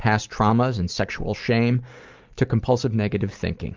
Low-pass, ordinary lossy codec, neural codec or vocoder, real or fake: 7.2 kHz; Opus, 32 kbps; none; real